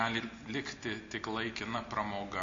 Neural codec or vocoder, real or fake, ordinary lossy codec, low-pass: none; real; MP3, 48 kbps; 7.2 kHz